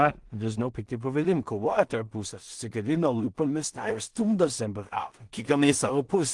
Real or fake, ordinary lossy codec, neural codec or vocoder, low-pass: fake; Opus, 24 kbps; codec, 16 kHz in and 24 kHz out, 0.4 kbps, LongCat-Audio-Codec, two codebook decoder; 10.8 kHz